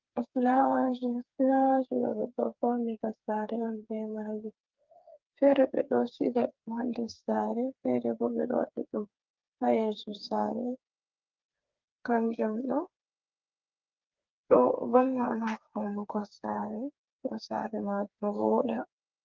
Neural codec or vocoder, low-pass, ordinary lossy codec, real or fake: codec, 16 kHz, 4 kbps, FreqCodec, smaller model; 7.2 kHz; Opus, 32 kbps; fake